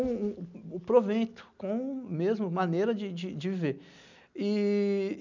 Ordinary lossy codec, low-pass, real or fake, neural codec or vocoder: none; 7.2 kHz; real; none